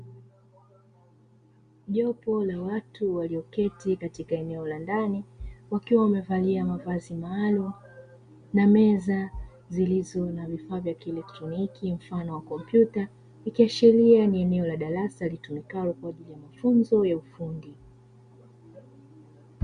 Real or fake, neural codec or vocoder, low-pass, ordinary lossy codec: real; none; 9.9 kHz; Opus, 64 kbps